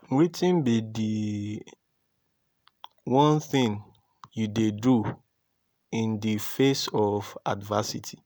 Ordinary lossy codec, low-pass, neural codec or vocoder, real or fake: none; none; none; real